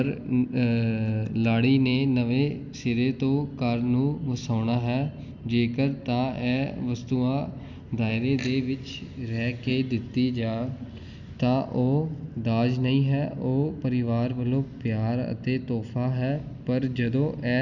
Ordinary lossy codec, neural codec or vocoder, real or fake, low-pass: none; none; real; 7.2 kHz